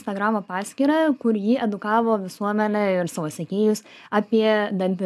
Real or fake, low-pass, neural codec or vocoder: real; 14.4 kHz; none